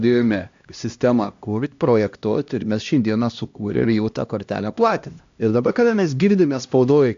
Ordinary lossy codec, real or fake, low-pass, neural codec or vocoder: AAC, 64 kbps; fake; 7.2 kHz; codec, 16 kHz, 1 kbps, X-Codec, HuBERT features, trained on LibriSpeech